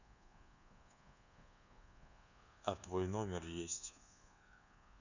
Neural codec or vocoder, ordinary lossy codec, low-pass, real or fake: codec, 24 kHz, 1.2 kbps, DualCodec; none; 7.2 kHz; fake